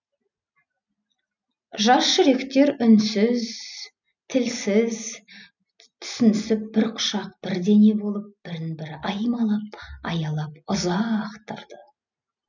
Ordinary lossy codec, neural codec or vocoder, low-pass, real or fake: none; none; 7.2 kHz; real